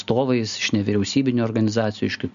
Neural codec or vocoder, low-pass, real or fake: none; 7.2 kHz; real